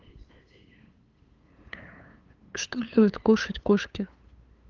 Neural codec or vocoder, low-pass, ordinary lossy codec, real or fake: codec, 16 kHz, 8 kbps, FunCodec, trained on LibriTTS, 25 frames a second; 7.2 kHz; Opus, 24 kbps; fake